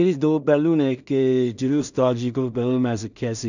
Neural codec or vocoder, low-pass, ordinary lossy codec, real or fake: codec, 16 kHz in and 24 kHz out, 0.4 kbps, LongCat-Audio-Codec, two codebook decoder; 7.2 kHz; none; fake